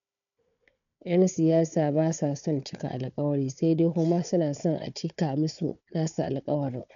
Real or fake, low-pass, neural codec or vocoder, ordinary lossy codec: fake; 7.2 kHz; codec, 16 kHz, 4 kbps, FunCodec, trained on Chinese and English, 50 frames a second; none